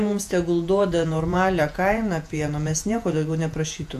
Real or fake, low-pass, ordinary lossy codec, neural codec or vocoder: fake; 14.4 kHz; AAC, 96 kbps; vocoder, 48 kHz, 128 mel bands, Vocos